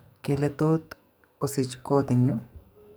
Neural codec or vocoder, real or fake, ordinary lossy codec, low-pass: codec, 44.1 kHz, 2.6 kbps, SNAC; fake; none; none